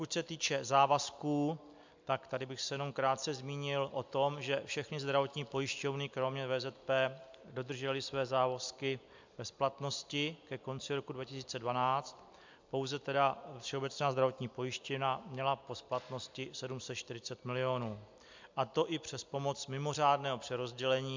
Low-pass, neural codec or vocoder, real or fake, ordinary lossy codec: 7.2 kHz; none; real; MP3, 64 kbps